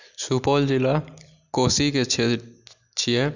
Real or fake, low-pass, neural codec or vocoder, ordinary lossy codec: real; 7.2 kHz; none; none